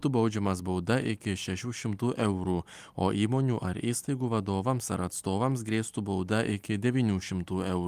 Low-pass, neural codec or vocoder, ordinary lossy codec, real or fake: 14.4 kHz; none; Opus, 32 kbps; real